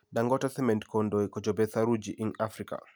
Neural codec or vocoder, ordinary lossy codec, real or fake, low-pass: none; none; real; none